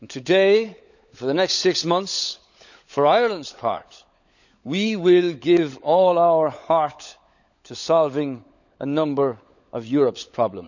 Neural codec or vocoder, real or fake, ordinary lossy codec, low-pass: codec, 16 kHz, 16 kbps, FunCodec, trained on LibriTTS, 50 frames a second; fake; none; 7.2 kHz